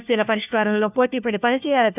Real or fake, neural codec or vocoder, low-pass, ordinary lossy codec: fake; codec, 16 kHz, 1 kbps, FunCodec, trained on LibriTTS, 50 frames a second; 3.6 kHz; none